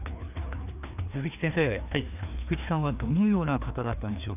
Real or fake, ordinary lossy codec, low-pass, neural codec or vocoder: fake; none; 3.6 kHz; codec, 16 kHz, 2 kbps, FreqCodec, larger model